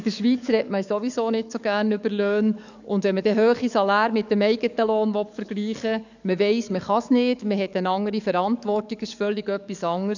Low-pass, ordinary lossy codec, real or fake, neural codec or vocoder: 7.2 kHz; none; fake; codec, 44.1 kHz, 7.8 kbps, DAC